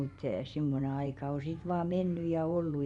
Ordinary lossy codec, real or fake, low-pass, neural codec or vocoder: none; fake; 10.8 kHz; vocoder, 24 kHz, 100 mel bands, Vocos